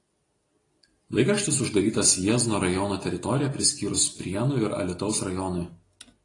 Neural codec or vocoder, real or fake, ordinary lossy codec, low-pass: none; real; AAC, 32 kbps; 10.8 kHz